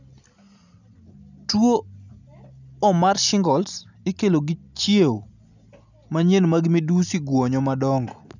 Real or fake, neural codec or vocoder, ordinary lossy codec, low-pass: real; none; none; 7.2 kHz